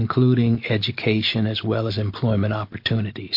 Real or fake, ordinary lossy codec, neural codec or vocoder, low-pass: real; MP3, 32 kbps; none; 5.4 kHz